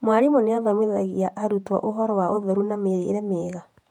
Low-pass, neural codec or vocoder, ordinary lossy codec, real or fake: 19.8 kHz; vocoder, 48 kHz, 128 mel bands, Vocos; MP3, 96 kbps; fake